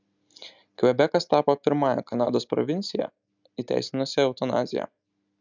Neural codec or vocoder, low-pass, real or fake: none; 7.2 kHz; real